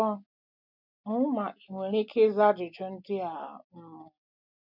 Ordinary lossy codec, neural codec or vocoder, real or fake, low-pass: none; none; real; 5.4 kHz